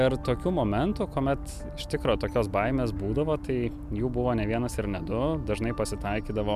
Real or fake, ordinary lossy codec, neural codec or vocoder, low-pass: real; MP3, 96 kbps; none; 14.4 kHz